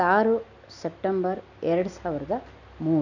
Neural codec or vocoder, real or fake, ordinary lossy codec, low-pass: none; real; none; 7.2 kHz